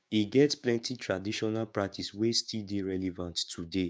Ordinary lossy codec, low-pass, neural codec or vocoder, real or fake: none; none; codec, 16 kHz, 6 kbps, DAC; fake